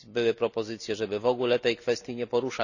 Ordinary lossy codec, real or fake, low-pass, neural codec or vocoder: none; real; 7.2 kHz; none